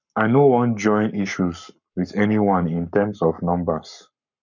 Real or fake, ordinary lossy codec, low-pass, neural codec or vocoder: fake; none; 7.2 kHz; codec, 44.1 kHz, 7.8 kbps, Pupu-Codec